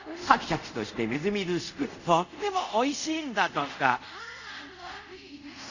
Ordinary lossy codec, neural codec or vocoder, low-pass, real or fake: none; codec, 24 kHz, 0.5 kbps, DualCodec; 7.2 kHz; fake